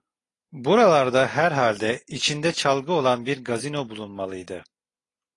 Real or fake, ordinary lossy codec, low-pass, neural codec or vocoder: real; AAC, 32 kbps; 10.8 kHz; none